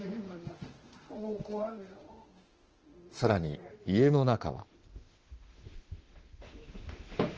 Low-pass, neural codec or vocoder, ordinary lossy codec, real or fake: 7.2 kHz; autoencoder, 48 kHz, 32 numbers a frame, DAC-VAE, trained on Japanese speech; Opus, 16 kbps; fake